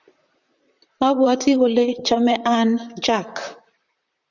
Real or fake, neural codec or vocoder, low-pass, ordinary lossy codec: fake; vocoder, 44.1 kHz, 128 mel bands, Pupu-Vocoder; 7.2 kHz; Opus, 64 kbps